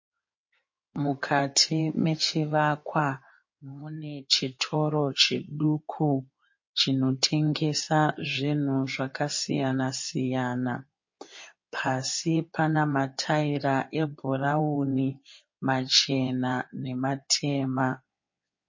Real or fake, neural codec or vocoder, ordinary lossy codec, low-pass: fake; codec, 16 kHz in and 24 kHz out, 2.2 kbps, FireRedTTS-2 codec; MP3, 32 kbps; 7.2 kHz